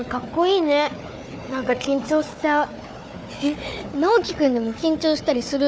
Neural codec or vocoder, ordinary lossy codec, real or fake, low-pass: codec, 16 kHz, 4 kbps, FunCodec, trained on Chinese and English, 50 frames a second; none; fake; none